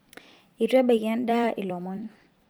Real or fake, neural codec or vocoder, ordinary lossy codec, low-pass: fake; vocoder, 44.1 kHz, 128 mel bands every 256 samples, BigVGAN v2; none; none